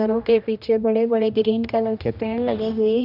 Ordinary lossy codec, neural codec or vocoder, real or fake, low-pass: none; codec, 16 kHz, 1 kbps, X-Codec, HuBERT features, trained on general audio; fake; 5.4 kHz